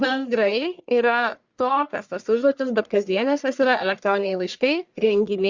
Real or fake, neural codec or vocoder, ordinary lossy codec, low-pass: fake; codec, 44.1 kHz, 1.7 kbps, Pupu-Codec; Opus, 64 kbps; 7.2 kHz